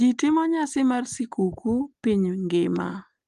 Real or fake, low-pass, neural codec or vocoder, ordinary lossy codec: real; 10.8 kHz; none; Opus, 24 kbps